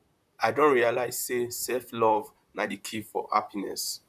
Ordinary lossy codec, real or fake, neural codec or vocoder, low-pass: none; fake; vocoder, 44.1 kHz, 128 mel bands, Pupu-Vocoder; 14.4 kHz